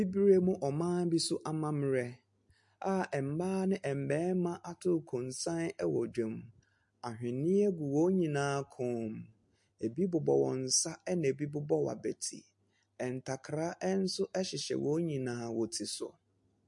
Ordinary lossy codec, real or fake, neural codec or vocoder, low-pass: MP3, 48 kbps; real; none; 10.8 kHz